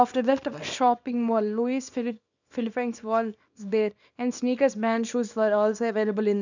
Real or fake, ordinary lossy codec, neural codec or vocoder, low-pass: fake; none; codec, 24 kHz, 0.9 kbps, WavTokenizer, small release; 7.2 kHz